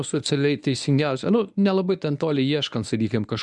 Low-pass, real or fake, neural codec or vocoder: 10.8 kHz; fake; codec, 24 kHz, 0.9 kbps, WavTokenizer, medium speech release version 1